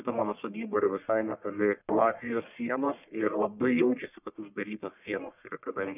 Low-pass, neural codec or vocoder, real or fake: 3.6 kHz; codec, 44.1 kHz, 1.7 kbps, Pupu-Codec; fake